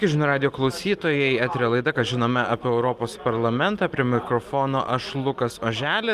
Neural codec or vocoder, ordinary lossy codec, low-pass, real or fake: none; Opus, 32 kbps; 14.4 kHz; real